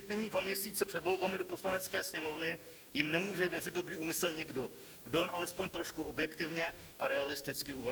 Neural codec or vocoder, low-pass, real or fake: codec, 44.1 kHz, 2.6 kbps, DAC; 19.8 kHz; fake